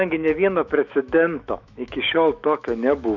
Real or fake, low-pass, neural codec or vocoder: fake; 7.2 kHz; autoencoder, 48 kHz, 128 numbers a frame, DAC-VAE, trained on Japanese speech